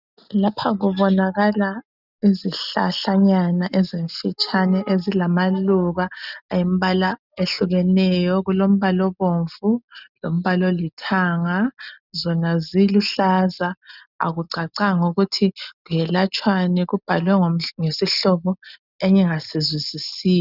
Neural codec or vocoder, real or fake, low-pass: none; real; 5.4 kHz